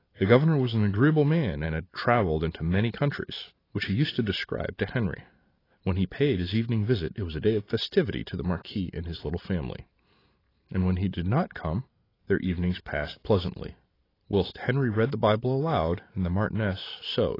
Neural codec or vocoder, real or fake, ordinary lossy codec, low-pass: vocoder, 44.1 kHz, 128 mel bands every 512 samples, BigVGAN v2; fake; AAC, 24 kbps; 5.4 kHz